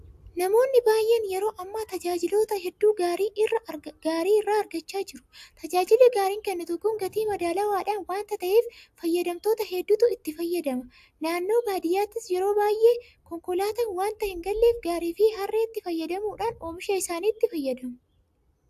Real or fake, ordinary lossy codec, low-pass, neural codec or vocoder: real; AAC, 96 kbps; 14.4 kHz; none